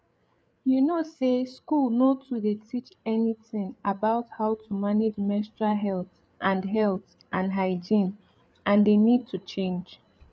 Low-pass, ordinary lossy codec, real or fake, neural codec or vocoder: none; none; fake; codec, 16 kHz, 8 kbps, FreqCodec, larger model